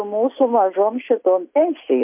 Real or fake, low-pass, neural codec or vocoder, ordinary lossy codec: real; 3.6 kHz; none; MP3, 32 kbps